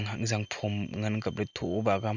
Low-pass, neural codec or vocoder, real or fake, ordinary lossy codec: 7.2 kHz; none; real; none